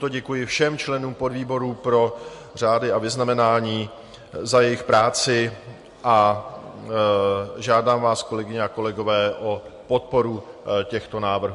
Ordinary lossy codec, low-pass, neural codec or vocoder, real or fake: MP3, 48 kbps; 14.4 kHz; none; real